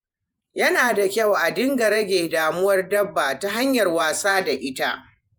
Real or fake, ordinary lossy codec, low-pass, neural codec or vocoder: fake; none; none; vocoder, 48 kHz, 128 mel bands, Vocos